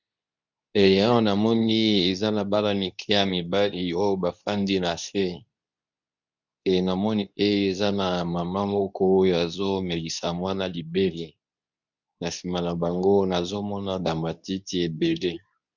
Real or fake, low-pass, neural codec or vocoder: fake; 7.2 kHz; codec, 24 kHz, 0.9 kbps, WavTokenizer, medium speech release version 2